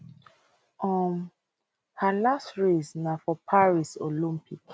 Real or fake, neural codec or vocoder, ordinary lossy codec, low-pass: real; none; none; none